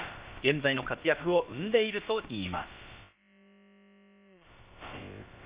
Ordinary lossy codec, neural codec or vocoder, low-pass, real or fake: Opus, 64 kbps; codec, 16 kHz, about 1 kbps, DyCAST, with the encoder's durations; 3.6 kHz; fake